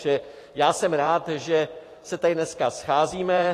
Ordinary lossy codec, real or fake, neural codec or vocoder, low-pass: AAC, 48 kbps; fake; vocoder, 44.1 kHz, 128 mel bands every 512 samples, BigVGAN v2; 14.4 kHz